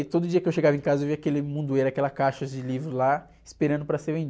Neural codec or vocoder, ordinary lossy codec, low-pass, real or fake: none; none; none; real